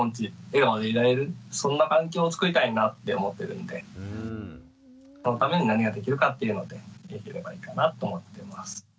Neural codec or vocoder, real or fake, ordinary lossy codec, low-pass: none; real; none; none